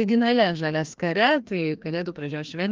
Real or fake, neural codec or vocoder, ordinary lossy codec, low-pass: fake; codec, 16 kHz, 1 kbps, FreqCodec, larger model; Opus, 24 kbps; 7.2 kHz